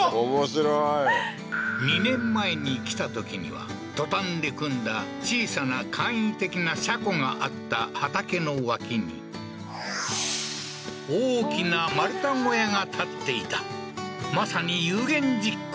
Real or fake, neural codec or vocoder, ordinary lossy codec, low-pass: real; none; none; none